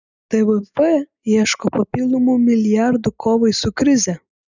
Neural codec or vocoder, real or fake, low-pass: none; real; 7.2 kHz